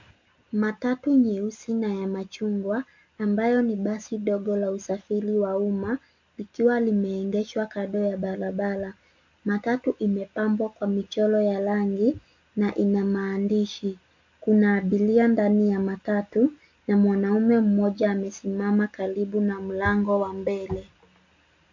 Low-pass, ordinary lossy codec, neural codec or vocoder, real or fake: 7.2 kHz; MP3, 48 kbps; none; real